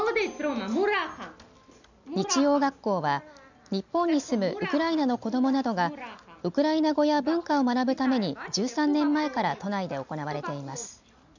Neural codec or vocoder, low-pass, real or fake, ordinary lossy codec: none; 7.2 kHz; real; none